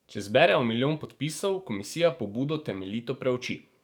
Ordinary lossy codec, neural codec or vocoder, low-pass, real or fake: none; codec, 44.1 kHz, 7.8 kbps, DAC; 19.8 kHz; fake